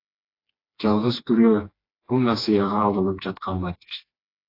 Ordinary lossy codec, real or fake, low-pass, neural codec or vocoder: AAC, 32 kbps; fake; 5.4 kHz; codec, 16 kHz, 2 kbps, FreqCodec, smaller model